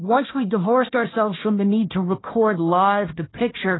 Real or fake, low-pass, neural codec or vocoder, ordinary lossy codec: fake; 7.2 kHz; codec, 16 kHz, 1 kbps, FunCodec, trained on Chinese and English, 50 frames a second; AAC, 16 kbps